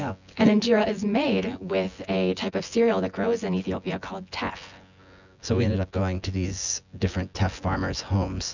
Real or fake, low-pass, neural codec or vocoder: fake; 7.2 kHz; vocoder, 24 kHz, 100 mel bands, Vocos